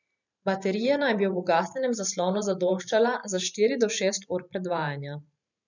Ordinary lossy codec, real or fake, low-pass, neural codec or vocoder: none; fake; 7.2 kHz; vocoder, 44.1 kHz, 128 mel bands every 512 samples, BigVGAN v2